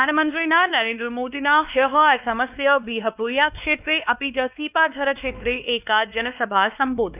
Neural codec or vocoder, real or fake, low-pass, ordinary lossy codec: codec, 16 kHz, 2 kbps, X-Codec, WavLM features, trained on Multilingual LibriSpeech; fake; 3.6 kHz; none